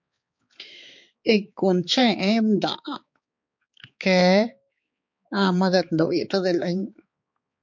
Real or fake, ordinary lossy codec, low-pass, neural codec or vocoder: fake; MP3, 48 kbps; 7.2 kHz; codec, 16 kHz, 4 kbps, X-Codec, HuBERT features, trained on balanced general audio